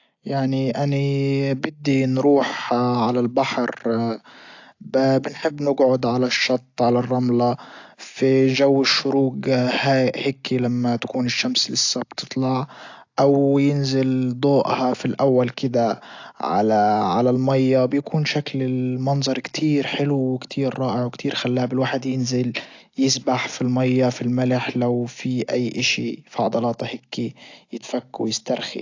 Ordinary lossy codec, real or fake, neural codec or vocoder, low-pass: AAC, 48 kbps; real; none; 7.2 kHz